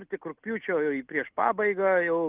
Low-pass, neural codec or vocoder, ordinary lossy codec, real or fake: 3.6 kHz; none; Opus, 32 kbps; real